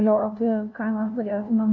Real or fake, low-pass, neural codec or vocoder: fake; 7.2 kHz; codec, 16 kHz, 0.5 kbps, FunCodec, trained on LibriTTS, 25 frames a second